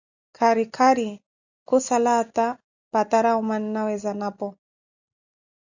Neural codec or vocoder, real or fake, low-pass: none; real; 7.2 kHz